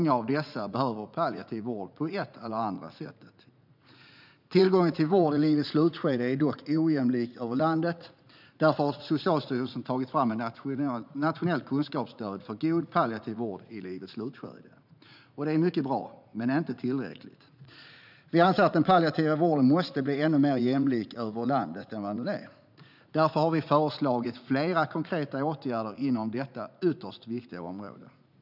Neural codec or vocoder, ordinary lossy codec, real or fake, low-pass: vocoder, 44.1 kHz, 80 mel bands, Vocos; none; fake; 5.4 kHz